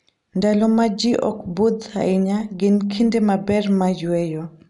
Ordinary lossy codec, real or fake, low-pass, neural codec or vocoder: none; real; 10.8 kHz; none